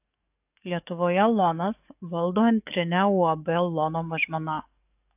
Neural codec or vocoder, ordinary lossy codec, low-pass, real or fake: codec, 44.1 kHz, 7.8 kbps, DAC; AAC, 32 kbps; 3.6 kHz; fake